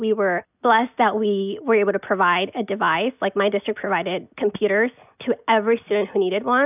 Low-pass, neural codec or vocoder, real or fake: 3.6 kHz; none; real